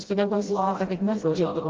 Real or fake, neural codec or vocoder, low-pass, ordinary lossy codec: fake; codec, 16 kHz, 0.5 kbps, FreqCodec, smaller model; 7.2 kHz; Opus, 16 kbps